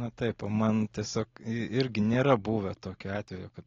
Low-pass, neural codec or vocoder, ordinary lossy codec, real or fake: 7.2 kHz; none; AAC, 32 kbps; real